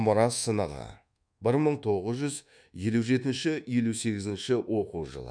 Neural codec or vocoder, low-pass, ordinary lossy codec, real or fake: codec, 24 kHz, 1.2 kbps, DualCodec; 9.9 kHz; none; fake